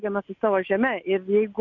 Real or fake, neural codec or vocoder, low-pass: real; none; 7.2 kHz